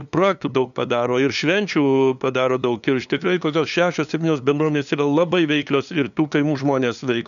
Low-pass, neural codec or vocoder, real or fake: 7.2 kHz; codec, 16 kHz, 2 kbps, FunCodec, trained on LibriTTS, 25 frames a second; fake